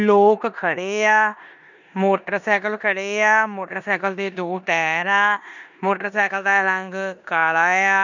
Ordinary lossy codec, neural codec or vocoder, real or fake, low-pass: none; codec, 16 kHz in and 24 kHz out, 0.9 kbps, LongCat-Audio-Codec, four codebook decoder; fake; 7.2 kHz